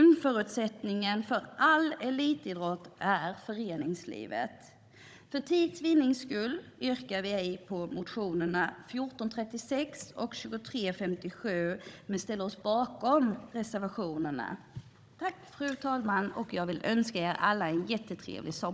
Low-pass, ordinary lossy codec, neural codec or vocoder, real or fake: none; none; codec, 16 kHz, 16 kbps, FunCodec, trained on Chinese and English, 50 frames a second; fake